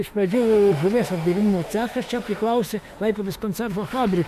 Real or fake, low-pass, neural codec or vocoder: fake; 14.4 kHz; autoencoder, 48 kHz, 32 numbers a frame, DAC-VAE, trained on Japanese speech